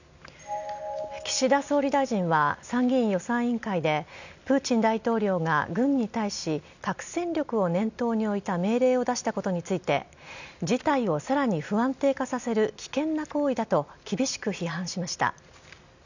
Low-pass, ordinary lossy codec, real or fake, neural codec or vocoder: 7.2 kHz; none; real; none